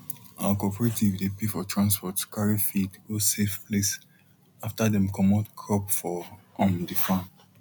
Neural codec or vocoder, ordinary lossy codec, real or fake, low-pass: none; none; real; 19.8 kHz